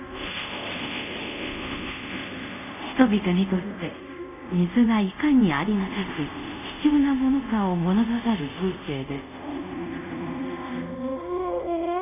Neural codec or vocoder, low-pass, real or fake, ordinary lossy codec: codec, 24 kHz, 0.5 kbps, DualCodec; 3.6 kHz; fake; none